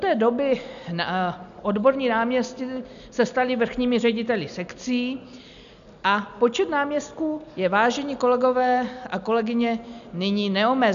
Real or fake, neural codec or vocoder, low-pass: real; none; 7.2 kHz